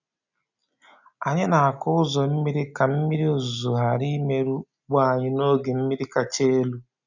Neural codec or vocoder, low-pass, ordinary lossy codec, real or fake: none; 7.2 kHz; none; real